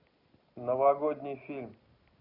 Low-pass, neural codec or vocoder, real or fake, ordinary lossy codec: 5.4 kHz; none; real; none